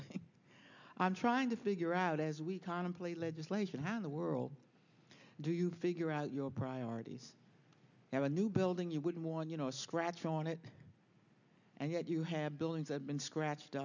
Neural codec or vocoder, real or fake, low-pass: none; real; 7.2 kHz